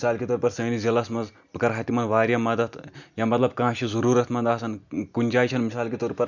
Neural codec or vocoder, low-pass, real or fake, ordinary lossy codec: none; 7.2 kHz; real; none